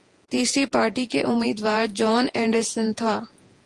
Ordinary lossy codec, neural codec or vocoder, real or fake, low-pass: Opus, 24 kbps; vocoder, 48 kHz, 128 mel bands, Vocos; fake; 10.8 kHz